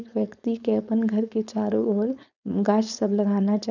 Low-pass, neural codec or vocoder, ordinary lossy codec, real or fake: 7.2 kHz; codec, 16 kHz, 4.8 kbps, FACodec; none; fake